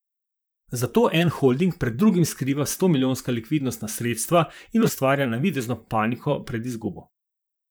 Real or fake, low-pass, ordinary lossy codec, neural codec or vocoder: fake; none; none; vocoder, 44.1 kHz, 128 mel bands, Pupu-Vocoder